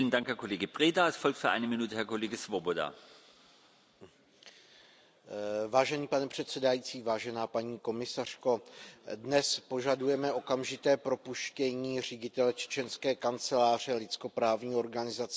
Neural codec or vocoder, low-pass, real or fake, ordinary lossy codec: none; none; real; none